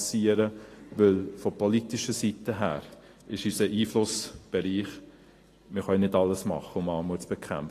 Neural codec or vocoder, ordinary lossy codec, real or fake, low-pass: none; AAC, 48 kbps; real; 14.4 kHz